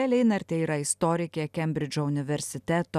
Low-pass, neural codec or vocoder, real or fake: 14.4 kHz; none; real